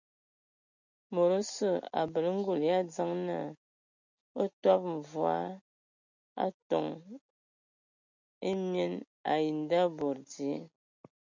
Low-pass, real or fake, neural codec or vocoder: 7.2 kHz; real; none